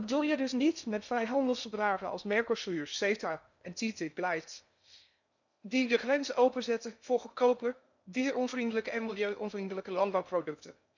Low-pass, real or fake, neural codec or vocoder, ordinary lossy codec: 7.2 kHz; fake; codec, 16 kHz in and 24 kHz out, 0.6 kbps, FocalCodec, streaming, 2048 codes; none